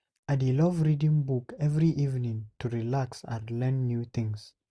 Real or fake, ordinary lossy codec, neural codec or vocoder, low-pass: real; none; none; none